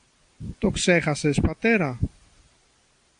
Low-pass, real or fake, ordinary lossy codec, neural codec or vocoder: 9.9 kHz; real; AAC, 64 kbps; none